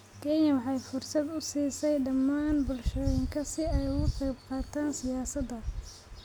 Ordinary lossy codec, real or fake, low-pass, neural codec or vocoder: none; real; 19.8 kHz; none